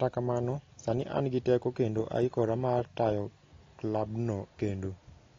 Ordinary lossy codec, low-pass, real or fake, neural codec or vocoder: AAC, 32 kbps; 7.2 kHz; real; none